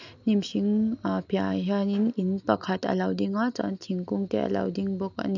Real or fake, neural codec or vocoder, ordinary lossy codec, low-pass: real; none; none; 7.2 kHz